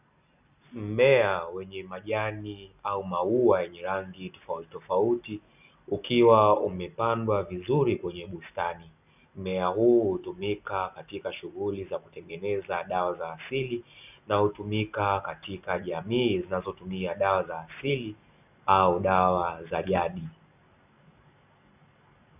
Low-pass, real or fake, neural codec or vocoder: 3.6 kHz; real; none